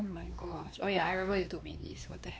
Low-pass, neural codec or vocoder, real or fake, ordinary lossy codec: none; codec, 16 kHz, 2 kbps, X-Codec, WavLM features, trained on Multilingual LibriSpeech; fake; none